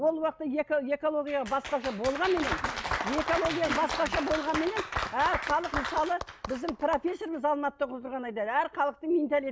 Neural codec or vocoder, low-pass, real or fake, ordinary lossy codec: none; none; real; none